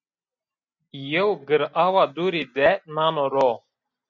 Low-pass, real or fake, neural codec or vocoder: 7.2 kHz; real; none